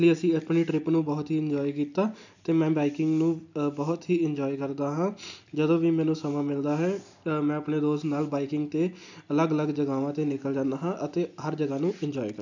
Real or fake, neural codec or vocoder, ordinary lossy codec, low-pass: real; none; none; 7.2 kHz